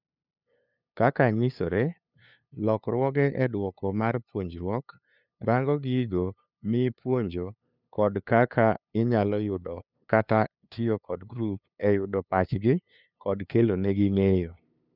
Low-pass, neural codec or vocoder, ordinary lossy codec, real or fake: 5.4 kHz; codec, 16 kHz, 2 kbps, FunCodec, trained on LibriTTS, 25 frames a second; none; fake